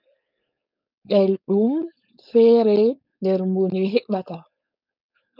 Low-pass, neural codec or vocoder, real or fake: 5.4 kHz; codec, 16 kHz, 4.8 kbps, FACodec; fake